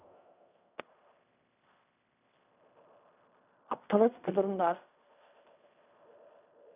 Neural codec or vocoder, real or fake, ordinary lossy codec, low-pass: codec, 16 kHz in and 24 kHz out, 0.4 kbps, LongCat-Audio-Codec, fine tuned four codebook decoder; fake; none; 3.6 kHz